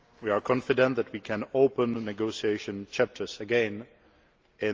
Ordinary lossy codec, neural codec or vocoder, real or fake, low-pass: Opus, 24 kbps; none; real; 7.2 kHz